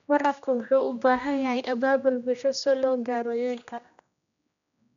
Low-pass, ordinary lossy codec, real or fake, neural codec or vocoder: 7.2 kHz; none; fake; codec, 16 kHz, 1 kbps, X-Codec, HuBERT features, trained on general audio